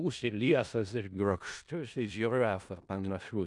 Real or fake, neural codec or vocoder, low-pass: fake; codec, 16 kHz in and 24 kHz out, 0.4 kbps, LongCat-Audio-Codec, four codebook decoder; 10.8 kHz